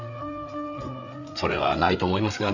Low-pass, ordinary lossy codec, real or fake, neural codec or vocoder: 7.2 kHz; none; fake; codec, 16 kHz, 8 kbps, FreqCodec, larger model